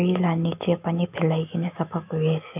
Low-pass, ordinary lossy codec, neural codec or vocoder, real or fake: 3.6 kHz; none; autoencoder, 48 kHz, 128 numbers a frame, DAC-VAE, trained on Japanese speech; fake